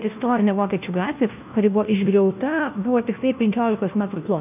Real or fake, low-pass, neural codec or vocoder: fake; 3.6 kHz; codec, 16 kHz, 1 kbps, FunCodec, trained on LibriTTS, 50 frames a second